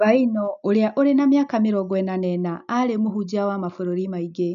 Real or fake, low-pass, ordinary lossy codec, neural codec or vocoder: real; 7.2 kHz; none; none